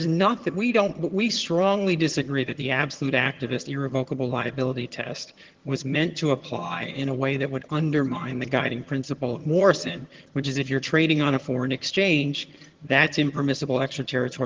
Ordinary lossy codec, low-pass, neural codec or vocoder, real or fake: Opus, 16 kbps; 7.2 kHz; vocoder, 22.05 kHz, 80 mel bands, HiFi-GAN; fake